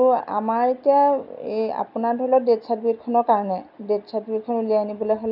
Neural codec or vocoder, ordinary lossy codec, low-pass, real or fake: none; none; 5.4 kHz; real